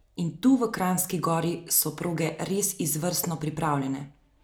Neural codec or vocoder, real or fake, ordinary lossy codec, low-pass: none; real; none; none